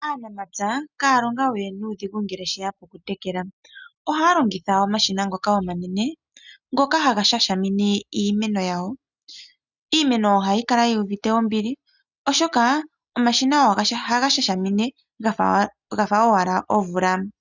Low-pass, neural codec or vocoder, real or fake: 7.2 kHz; none; real